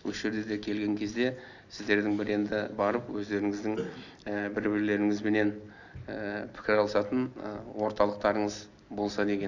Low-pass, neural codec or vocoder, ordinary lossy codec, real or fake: 7.2 kHz; none; none; real